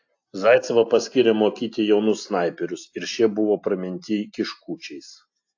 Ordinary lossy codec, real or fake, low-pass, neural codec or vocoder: AAC, 48 kbps; real; 7.2 kHz; none